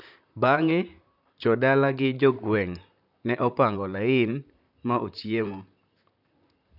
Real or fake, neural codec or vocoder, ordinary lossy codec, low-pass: fake; vocoder, 44.1 kHz, 128 mel bands, Pupu-Vocoder; none; 5.4 kHz